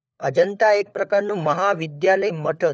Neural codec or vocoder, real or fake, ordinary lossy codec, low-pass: codec, 16 kHz, 4 kbps, FunCodec, trained on LibriTTS, 50 frames a second; fake; none; none